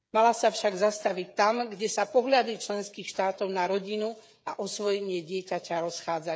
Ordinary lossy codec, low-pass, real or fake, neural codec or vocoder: none; none; fake; codec, 16 kHz, 16 kbps, FreqCodec, smaller model